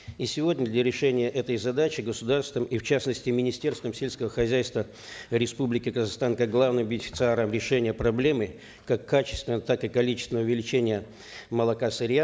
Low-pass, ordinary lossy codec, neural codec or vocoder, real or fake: none; none; none; real